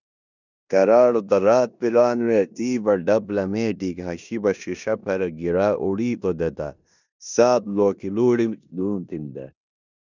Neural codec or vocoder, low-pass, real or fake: codec, 16 kHz in and 24 kHz out, 0.9 kbps, LongCat-Audio-Codec, four codebook decoder; 7.2 kHz; fake